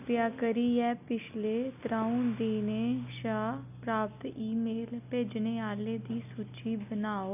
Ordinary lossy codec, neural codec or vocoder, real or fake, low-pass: none; none; real; 3.6 kHz